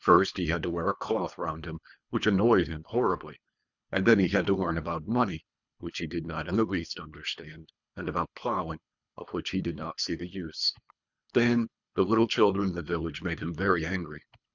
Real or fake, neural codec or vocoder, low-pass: fake; codec, 24 kHz, 3 kbps, HILCodec; 7.2 kHz